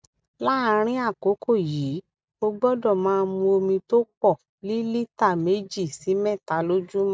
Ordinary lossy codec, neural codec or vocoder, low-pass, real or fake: none; none; none; real